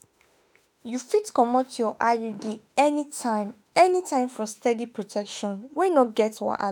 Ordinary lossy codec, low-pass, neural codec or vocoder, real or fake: none; none; autoencoder, 48 kHz, 32 numbers a frame, DAC-VAE, trained on Japanese speech; fake